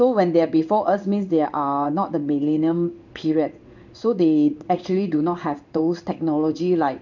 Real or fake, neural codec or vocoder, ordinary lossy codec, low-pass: fake; codec, 16 kHz in and 24 kHz out, 1 kbps, XY-Tokenizer; none; 7.2 kHz